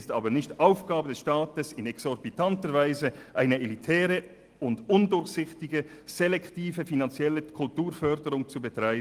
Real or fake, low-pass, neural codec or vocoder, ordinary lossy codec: real; 14.4 kHz; none; Opus, 24 kbps